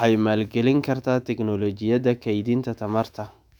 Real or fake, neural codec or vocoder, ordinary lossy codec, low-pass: fake; autoencoder, 48 kHz, 128 numbers a frame, DAC-VAE, trained on Japanese speech; none; 19.8 kHz